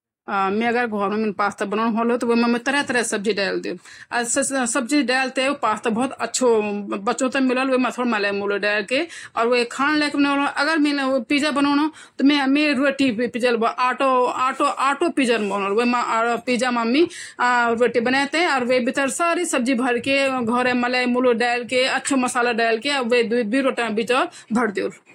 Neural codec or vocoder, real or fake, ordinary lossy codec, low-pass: none; real; AAC, 48 kbps; 19.8 kHz